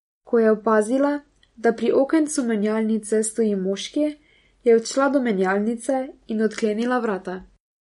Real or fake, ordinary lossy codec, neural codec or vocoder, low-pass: real; MP3, 48 kbps; none; 19.8 kHz